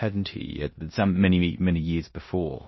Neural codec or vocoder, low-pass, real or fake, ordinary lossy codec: codec, 16 kHz in and 24 kHz out, 0.9 kbps, LongCat-Audio-Codec, four codebook decoder; 7.2 kHz; fake; MP3, 24 kbps